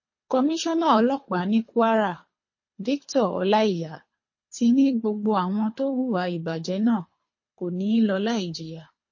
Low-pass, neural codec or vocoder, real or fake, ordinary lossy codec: 7.2 kHz; codec, 24 kHz, 3 kbps, HILCodec; fake; MP3, 32 kbps